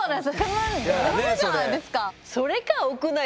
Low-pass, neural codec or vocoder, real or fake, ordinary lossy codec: none; none; real; none